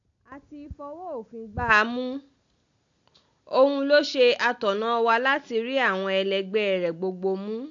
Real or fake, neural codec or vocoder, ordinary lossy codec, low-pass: real; none; AAC, 64 kbps; 7.2 kHz